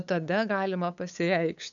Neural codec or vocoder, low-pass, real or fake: codec, 16 kHz, 8 kbps, FunCodec, trained on LibriTTS, 25 frames a second; 7.2 kHz; fake